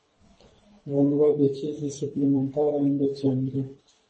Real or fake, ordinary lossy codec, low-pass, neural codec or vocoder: fake; MP3, 32 kbps; 10.8 kHz; codec, 24 kHz, 3 kbps, HILCodec